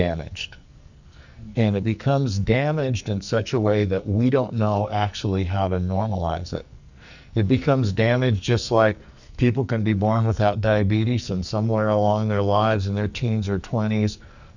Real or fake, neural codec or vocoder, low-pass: fake; codec, 44.1 kHz, 2.6 kbps, SNAC; 7.2 kHz